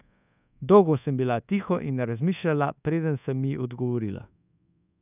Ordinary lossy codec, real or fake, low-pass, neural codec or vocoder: none; fake; 3.6 kHz; codec, 24 kHz, 1.2 kbps, DualCodec